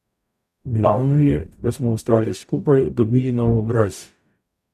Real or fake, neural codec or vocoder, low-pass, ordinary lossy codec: fake; codec, 44.1 kHz, 0.9 kbps, DAC; 14.4 kHz; none